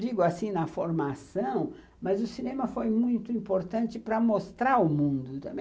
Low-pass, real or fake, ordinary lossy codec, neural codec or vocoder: none; real; none; none